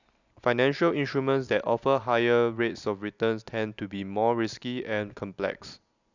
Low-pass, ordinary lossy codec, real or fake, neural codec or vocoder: 7.2 kHz; none; real; none